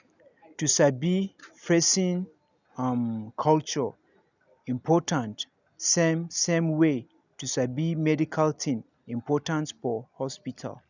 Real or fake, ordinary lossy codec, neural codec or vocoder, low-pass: real; none; none; 7.2 kHz